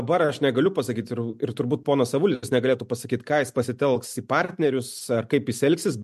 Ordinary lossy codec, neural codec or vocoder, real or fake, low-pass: MP3, 64 kbps; none; real; 14.4 kHz